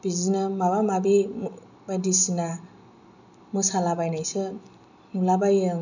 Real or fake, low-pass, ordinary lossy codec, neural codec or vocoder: real; 7.2 kHz; none; none